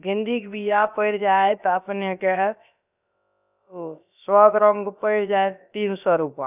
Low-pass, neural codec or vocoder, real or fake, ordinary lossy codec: 3.6 kHz; codec, 16 kHz, about 1 kbps, DyCAST, with the encoder's durations; fake; none